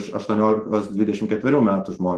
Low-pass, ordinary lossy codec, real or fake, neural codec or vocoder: 14.4 kHz; Opus, 16 kbps; fake; autoencoder, 48 kHz, 128 numbers a frame, DAC-VAE, trained on Japanese speech